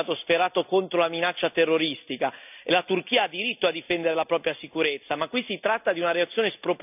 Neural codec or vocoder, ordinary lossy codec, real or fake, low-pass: none; none; real; 3.6 kHz